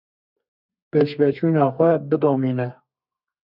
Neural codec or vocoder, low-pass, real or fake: codec, 32 kHz, 1.9 kbps, SNAC; 5.4 kHz; fake